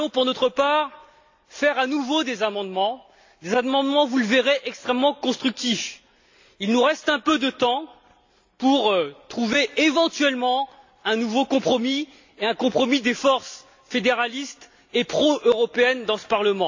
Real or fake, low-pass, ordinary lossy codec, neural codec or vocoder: real; 7.2 kHz; AAC, 48 kbps; none